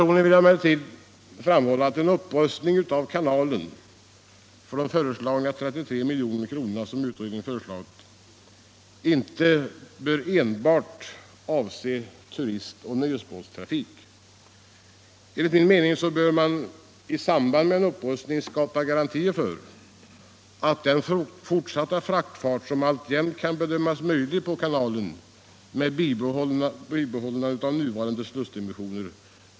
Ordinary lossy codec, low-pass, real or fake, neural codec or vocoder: none; none; real; none